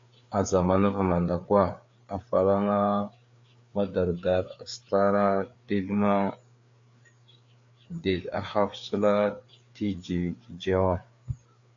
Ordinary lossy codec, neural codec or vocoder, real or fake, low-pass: AAC, 48 kbps; codec, 16 kHz, 4 kbps, FreqCodec, larger model; fake; 7.2 kHz